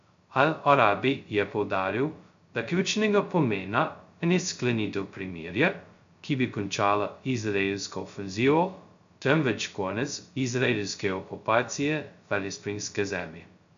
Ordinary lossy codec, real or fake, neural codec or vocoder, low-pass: MP3, 64 kbps; fake; codec, 16 kHz, 0.2 kbps, FocalCodec; 7.2 kHz